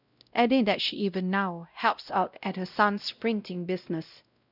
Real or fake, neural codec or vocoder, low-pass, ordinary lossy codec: fake; codec, 16 kHz, 0.5 kbps, X-Codec, WavLM features, trained on Multilingual LibriSpeech; 5.4 kHz; none